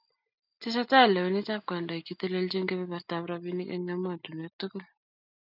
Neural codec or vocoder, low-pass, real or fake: none; 5.4 kHz; real